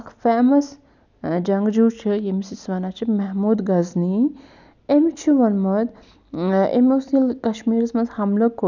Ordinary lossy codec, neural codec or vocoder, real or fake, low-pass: none; none; real; 7.2 kHz